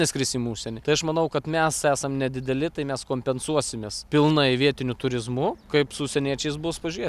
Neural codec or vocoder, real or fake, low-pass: none; real; 14.4 kHz